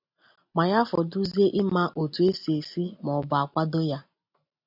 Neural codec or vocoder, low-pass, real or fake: none; 5.4 kHz; real